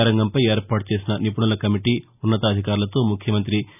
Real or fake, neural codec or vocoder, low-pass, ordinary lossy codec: real; none; 3.6 kHz; none